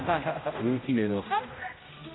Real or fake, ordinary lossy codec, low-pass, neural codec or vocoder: fake; AAC, 16 kbps; 7.2 kHz; codec, 16 kHz, 0.5 kbps, X-Codec, HuBERT features, trained on general audio